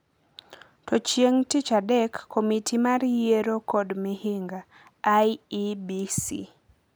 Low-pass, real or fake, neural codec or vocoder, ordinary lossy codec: none; real; none; none